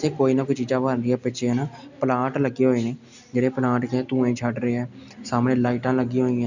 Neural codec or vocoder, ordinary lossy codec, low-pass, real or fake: none; none; 7.2 kHz; real